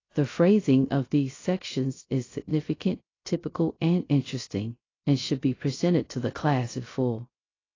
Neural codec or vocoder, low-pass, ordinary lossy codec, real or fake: codec, 16 kHz, 0.3 kbps, FocalCodec; 7.2 kHz; AAC, 32 kbps; fake